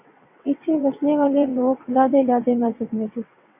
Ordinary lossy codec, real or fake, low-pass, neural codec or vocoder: AAC, 24 kbps; fake; 3.6 kHz; vocoder, 22.05 kHz, 80 mel bands, WaveNeXt